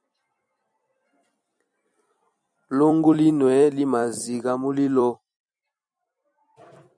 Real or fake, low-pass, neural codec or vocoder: fake; 9.9 kHz; vocoder, 44.1 kHz, 128 mel bands every 256 samples, BigVGAN v2